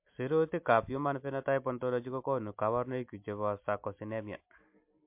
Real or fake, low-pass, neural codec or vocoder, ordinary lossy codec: real; 3.6 kHz; none; MP3, 32 kbps